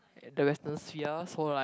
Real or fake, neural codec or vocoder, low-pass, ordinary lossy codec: real; none; none; none